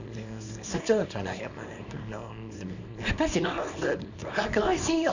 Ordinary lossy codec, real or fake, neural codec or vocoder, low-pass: none; fake; codec, 24 kHz, 0.9 kbps, WavTokenizer, small release; 7.2 kHz